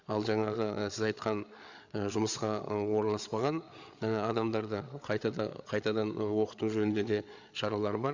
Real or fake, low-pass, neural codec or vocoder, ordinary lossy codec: fake; 7.2 kHz; codec, 16 kHz, 8 kbps, FreqCodec, larger model; Opus, 64 kbps